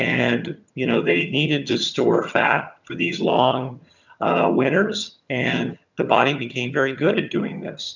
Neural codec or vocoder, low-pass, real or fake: vocoder, 22.05 kHz, 80 mel bands, HiFi-GAN; 7.2 kHz; fake